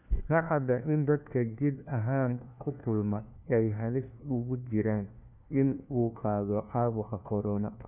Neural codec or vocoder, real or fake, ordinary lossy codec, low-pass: codec, 16 kHz, 1 kbps, FunCodec, trained on LibriTTS, 50 frames a second; fake; none; 3.6 kHz